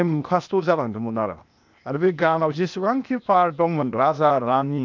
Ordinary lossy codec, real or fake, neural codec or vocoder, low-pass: MP3, 64 kbps; fake; codec, 16 kHz, 0.8 kbps, ZipCodec; 7.2 kHz